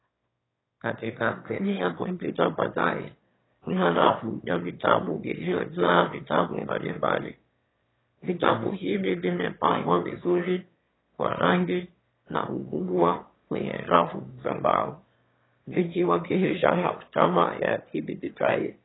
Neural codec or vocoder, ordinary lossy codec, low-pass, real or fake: autoencoder, 22.05 kHz, a latent of 192 numbers a frame, VITS, trained on one speaker; AAC, 16 kbps; 7.2 kHz; fake